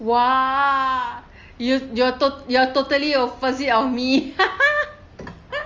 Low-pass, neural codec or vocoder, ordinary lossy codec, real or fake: 7.2 kHz; none; Opus, 32 kbps; real